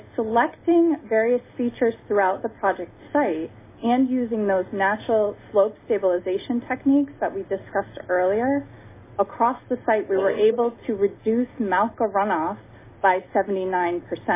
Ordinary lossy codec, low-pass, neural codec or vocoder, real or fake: MP3, 16 kbps; 3.6 kHz; none; real